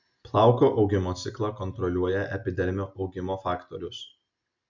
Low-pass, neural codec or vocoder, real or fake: 7.2 kHz; none; real